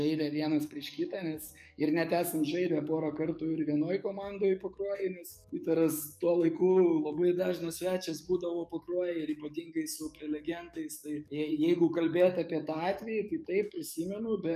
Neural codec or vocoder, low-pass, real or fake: codec, 44.1 kHz, 7.8 kbps, DAC; 14.4 kHz; fake